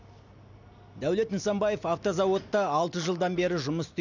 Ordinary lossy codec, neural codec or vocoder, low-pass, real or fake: none; none; 7.2 kHz; real